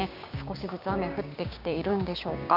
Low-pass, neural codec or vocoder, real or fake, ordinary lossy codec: 5.4 kHz; codec, 16 kHz, 6 kbps, DAC; fake; none